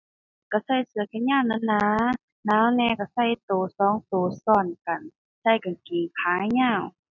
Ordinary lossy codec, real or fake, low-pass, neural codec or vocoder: none; real; 7.2 kHz; none